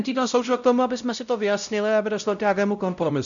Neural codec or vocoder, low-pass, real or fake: codec, 16 kHz, 0.5 kbps, X-Codec, WavLM features, trained on Multilingual LibriSpeech; 7.2 kHz; fake